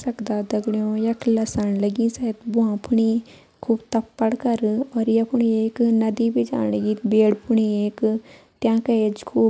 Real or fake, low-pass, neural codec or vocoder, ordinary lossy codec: real; none; none; none